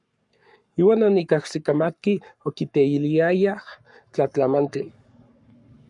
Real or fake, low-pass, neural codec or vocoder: fake; 10.8 kHz; codec, 44.1 kHz, 7.8 kbps, Pupu-Codec